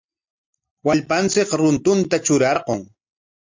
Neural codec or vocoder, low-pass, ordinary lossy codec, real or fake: none; 7.2 kHz; MP3, 64 kbps; real